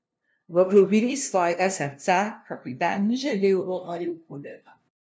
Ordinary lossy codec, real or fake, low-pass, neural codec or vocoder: none; fake; none; codec, 16 kHz, 0.5 kbps, FunCodec, trained on LibriTTS, 25 frames a second